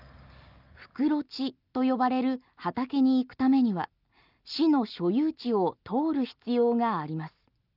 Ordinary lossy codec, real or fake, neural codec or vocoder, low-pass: Opus, 24 kbps; real; none; 5.4 kHz